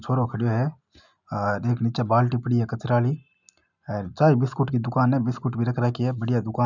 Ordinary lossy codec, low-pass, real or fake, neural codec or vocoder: none; 7.2 kHz; real; none